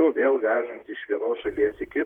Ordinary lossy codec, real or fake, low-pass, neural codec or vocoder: Opus, 64 kbps; fake; 19.8 kHz; vocoder, 44.1 kHz, 128 mel bands, Pupu-Vocoder